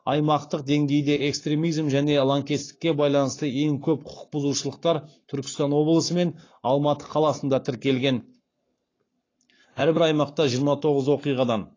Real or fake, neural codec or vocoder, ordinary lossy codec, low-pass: fake; codec, 44.1 kHz, 7.8 kbps, Pupu-Codec; AAC, 32 kbps; 7.2 kHz